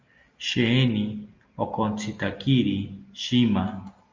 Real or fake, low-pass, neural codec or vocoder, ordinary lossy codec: real; 7.2 kHz; none; Opus, 32 kbps